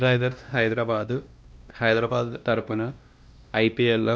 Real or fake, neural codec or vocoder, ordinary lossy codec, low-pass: fake; codec, 16 kHz, 1 kbps, X-Codec, WavLM features, trained on Multilingual LibriSpeech; none; none